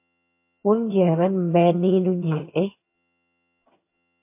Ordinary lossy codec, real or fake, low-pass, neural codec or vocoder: MP3, 24 kbps; fake; 3.6 kHz; vocoder, 22.05 kHz, 80 mel bands, HiFi-GAN